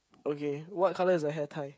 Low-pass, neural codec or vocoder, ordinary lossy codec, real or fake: none; codec, 16 kHz, 8 kbps, FreqCodec, smaller model; none; fake